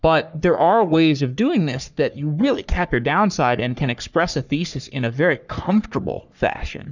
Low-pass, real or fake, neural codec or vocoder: 7.2 kHz; fake; codec, 44.1 kHz, 3.4 kbps, Pupu-Codec